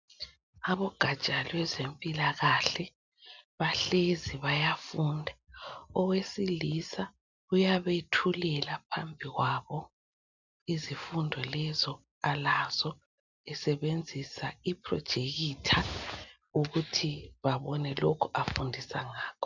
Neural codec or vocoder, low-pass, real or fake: none; 7.2 kHz; real